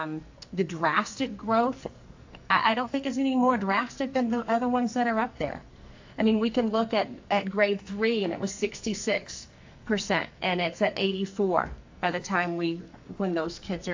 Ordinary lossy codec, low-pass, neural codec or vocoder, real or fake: AAC, 48 kbps; 7.2 kHz; codec, 44.1 kHz, 2.6 kbps, SNAC; fake